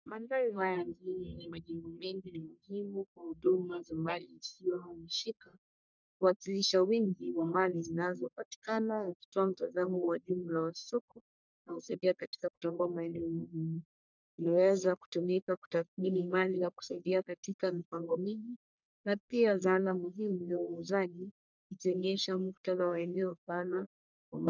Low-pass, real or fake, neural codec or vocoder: 7.2 kHz; fake; codec, 44.1 kHz, 1.7 kbps, Pupu-Codec